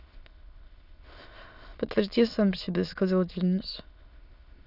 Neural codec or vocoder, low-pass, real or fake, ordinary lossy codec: autoencoder, 22.05 kHz, a latent of 192 numbers a frame, VITS, trained on many speakers; 5.4 kHz; fake; none